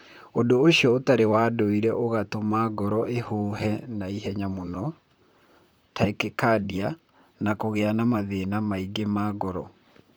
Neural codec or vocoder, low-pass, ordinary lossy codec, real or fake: vocoder, 44.1 kHz, 128 mel bands, Pupu-Vocoder; none; none; fake